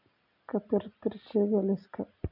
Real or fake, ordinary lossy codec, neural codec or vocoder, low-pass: real; none; none; 5.4 kHz